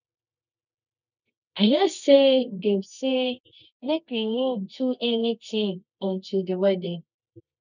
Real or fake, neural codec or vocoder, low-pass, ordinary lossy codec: fake; codec, 24 kHz, 0.9 kbps, WavTokenizer, medium music audio release; 7.2 kHz; none